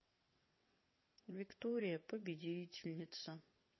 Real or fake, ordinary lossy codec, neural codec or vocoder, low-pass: fake; MP3, 24 kbps; vocoder, 22.05 kHz, 80 mel bands, WaveNeXt; 7.2 kHz